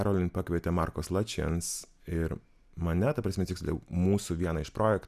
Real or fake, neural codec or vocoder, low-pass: real; none; 14.4 kHz